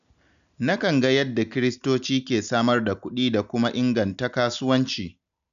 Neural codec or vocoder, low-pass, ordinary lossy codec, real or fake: none; 7.2 kHz; none; real